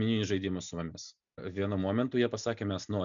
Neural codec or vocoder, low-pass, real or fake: none; 7.2 kHz; real